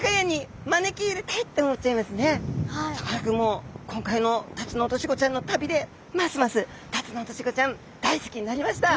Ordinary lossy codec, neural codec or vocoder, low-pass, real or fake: none; none; none; real